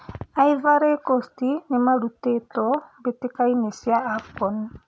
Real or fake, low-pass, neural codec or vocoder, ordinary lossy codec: real; none; none; none